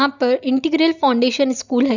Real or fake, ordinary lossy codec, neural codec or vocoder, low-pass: real; none; none; 7.2 kHz